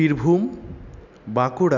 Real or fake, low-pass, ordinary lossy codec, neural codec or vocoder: real; 7.2 kHz; none; none